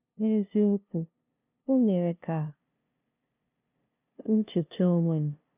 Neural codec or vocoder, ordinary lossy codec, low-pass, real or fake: codec, 16 kHz, 0.5 kbps, FunCodec, trained on LibriTTS, 25 frames a second; none; 3.6 kHz; fake